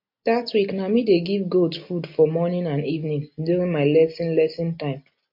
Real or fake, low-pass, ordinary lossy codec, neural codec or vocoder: real; 5.4 kHz; MP3, 32 kbps; none